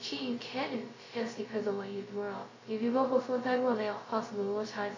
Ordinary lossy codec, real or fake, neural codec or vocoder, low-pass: AAC, 32 kbps; fake; codec, 16 kHz, 0.2 kbps, FocalCodec; 7.2 kHz